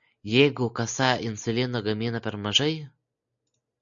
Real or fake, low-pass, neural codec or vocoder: real; 7.2 kHz; none